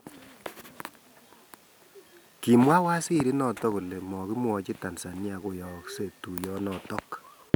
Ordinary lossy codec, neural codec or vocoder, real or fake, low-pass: none; none; real; none